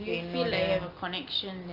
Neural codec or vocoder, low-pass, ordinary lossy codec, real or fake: none; 5.4 kHz; Opus, 24 kbps; real